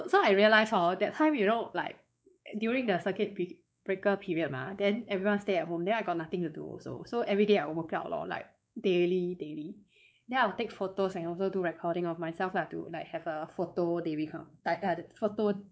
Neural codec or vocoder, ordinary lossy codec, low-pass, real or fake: codec, 16 kHz, 4 kbps, X-Codec, WavLM features, trained on Multilingual LibriSpeech; none; none; fake